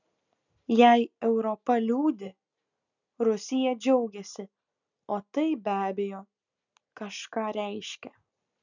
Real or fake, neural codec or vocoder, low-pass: real; none; 7.2 kHz